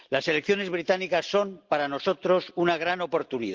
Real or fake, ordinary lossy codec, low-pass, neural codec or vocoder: real; Opus, 24 kbps; 7.2 kHz; none